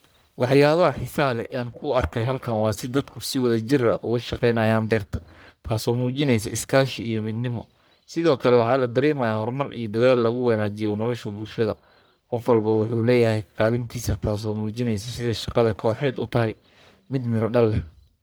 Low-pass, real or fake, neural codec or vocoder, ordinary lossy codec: none; fake; codec, 44.1 kHz, 1.7 kbps, Pupu-Codec; none